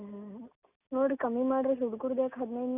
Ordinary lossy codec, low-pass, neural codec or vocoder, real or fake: none; 3.6 kHz; none; real